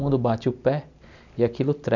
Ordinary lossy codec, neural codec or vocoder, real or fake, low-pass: none; none; real; 7.2 kHz